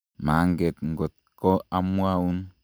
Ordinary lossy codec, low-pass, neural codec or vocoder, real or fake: none; none; none; real